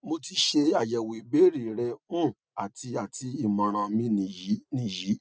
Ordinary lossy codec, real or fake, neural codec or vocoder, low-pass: none; real; none; none